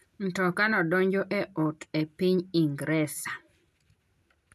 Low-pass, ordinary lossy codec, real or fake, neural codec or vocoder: 14.4 kHz; MP3, 96 kbps; real; none